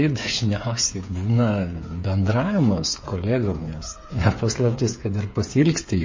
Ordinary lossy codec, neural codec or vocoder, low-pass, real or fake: MP3, 32 kbps; codec, 16 kHz, 8 kbps, FreqCodec, smaller model; 7.2 kHz; fake